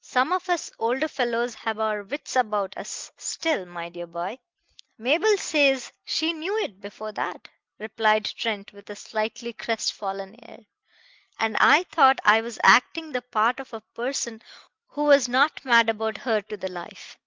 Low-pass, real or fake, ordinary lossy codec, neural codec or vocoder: 7.2 kHz; real; Opus, 32 kbps; none